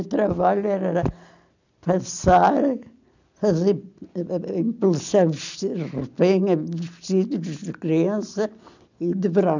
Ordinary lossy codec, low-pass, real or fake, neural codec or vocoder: none; 7.2 kHz; real; none